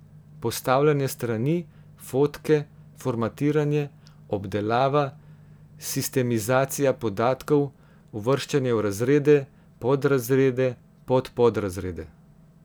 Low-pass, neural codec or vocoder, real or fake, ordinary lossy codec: none; none; real; none